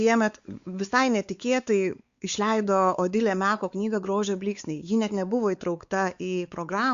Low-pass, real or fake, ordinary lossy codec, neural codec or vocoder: 7.2 kHz; fake; Opus, 64 kbps; codec, 16 kHz, 4 kbps, X-Codec, WavLM features, trained on Multilingual LibriSpeech